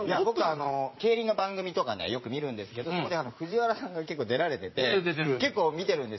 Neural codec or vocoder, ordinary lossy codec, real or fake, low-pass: vocoder, 44.1 kHz, 80 mel bands, Vocos; MP3, 24 kbps; fake; 7.2 kHz